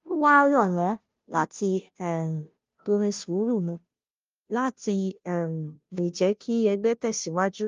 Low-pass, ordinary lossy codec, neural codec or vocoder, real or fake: 7.2 kHz; Opus, 32 kbps; codec, 16 kHz, 0.5 kbps, FunCodec, trained on Chinese and English, 25 frames a second; fake